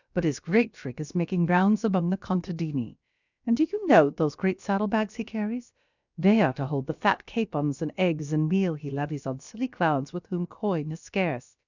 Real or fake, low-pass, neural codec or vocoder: fake; 7.2 kHz; codec, 16 kHz, about 1 kbps, DyCAST, with the encoder's durations